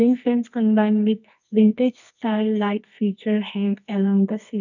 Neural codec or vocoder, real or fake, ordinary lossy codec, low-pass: codec, 24 kHz, 0.9 kbps, WavTokenizer, medium music audio release; fake; none; 7.2 kHz